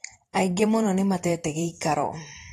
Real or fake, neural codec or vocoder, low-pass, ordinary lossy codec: fake; vocoder, 44.1 kHz, 128 mel bands every 512 samples, BigVGAN v2; 19.8 kHz; AAC, 32 kbps